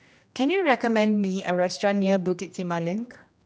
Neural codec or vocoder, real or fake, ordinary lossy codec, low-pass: codec, 16 kHz, 1 kbps, X-Codec, HuBERT features, trained on general audio; fake; none; none